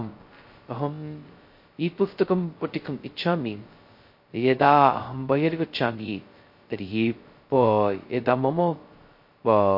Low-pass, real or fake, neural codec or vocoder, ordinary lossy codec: 5.4 kHz; fake; codec, 16 kHz, 0.2 kbps, FocalCodec; MP3, 32 kbps